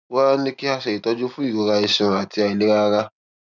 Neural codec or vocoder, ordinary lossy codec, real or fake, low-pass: none; none; real; 7.2 kHz